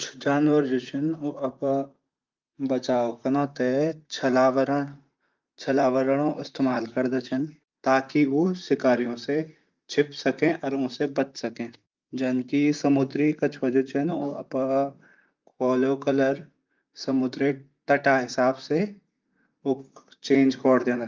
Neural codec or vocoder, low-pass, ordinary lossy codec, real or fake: vocoder, 44.1 kHz, 128 mel bands, Pupu-Vocoder; 7.2 kHz; Opus, 24 kbps; fake